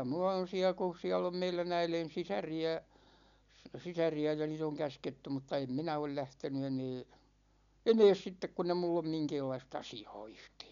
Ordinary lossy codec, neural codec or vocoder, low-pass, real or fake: MP3, 96 kbps; none; 7.2 kHz; real